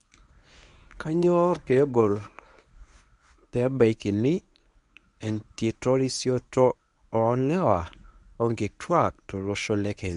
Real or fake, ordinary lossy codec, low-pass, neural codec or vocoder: fake; none; 10.8 kHz; codec, 24 kHz, 0.9 kbps, WavTokenizer, medium speech release version 1